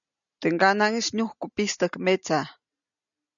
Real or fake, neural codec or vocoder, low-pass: real; none; 7.2 kHz